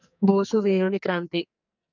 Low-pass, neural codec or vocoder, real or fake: 7.2 kHz; codec, 44.1 kHz, 2.6 kbps, SNAC; fake